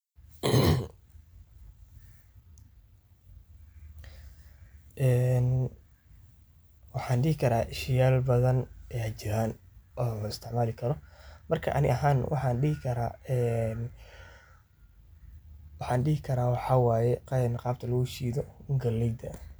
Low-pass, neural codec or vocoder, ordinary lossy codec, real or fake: none; none; none; real